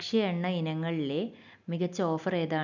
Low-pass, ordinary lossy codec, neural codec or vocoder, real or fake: 7.2 kHz; none; none; real